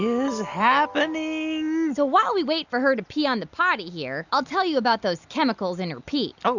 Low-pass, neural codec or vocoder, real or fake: 7.2 kHz; none; real